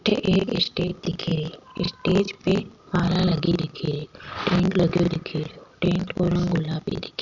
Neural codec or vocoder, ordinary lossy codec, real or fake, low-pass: none; none; real; 7.2 kHz